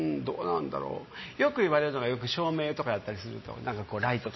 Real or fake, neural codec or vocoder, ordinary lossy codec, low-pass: real; none; MP3, 24 kbps; 7.2 kHz